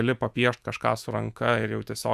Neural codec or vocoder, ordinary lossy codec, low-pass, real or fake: autoencoder, 48 kHz, 128 numbers a frame, DAC-VAE, trained on Japanese speech; Opus, 64 kbps; 14.4 kHz; fake